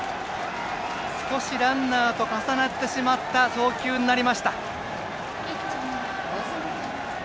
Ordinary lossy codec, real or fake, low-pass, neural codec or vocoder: none; real; none; none